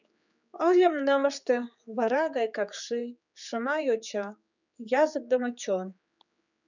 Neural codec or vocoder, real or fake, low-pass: codec, 16 kHz, 4 kbps, X-Codec, HuBERT features, trained on general audio; fake; 7.2 kHz